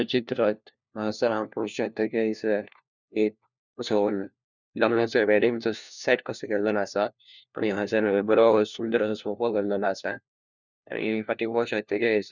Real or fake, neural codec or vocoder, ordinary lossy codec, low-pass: fake; codec, 16 kHz, 1 kbps, FunCodec, trained on LibriTTS, 50 frames a second; none; 7.2 kHz